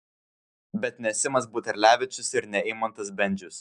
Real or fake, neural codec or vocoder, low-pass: real; none; 10.8 kHz